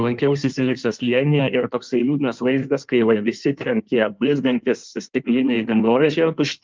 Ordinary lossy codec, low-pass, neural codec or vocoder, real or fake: Opus, 24 kbps; 7.2 kHz; codec, 16 kHz in and 24 kHz out, 1.1 kbps, FireRedTTS-2 codec; fake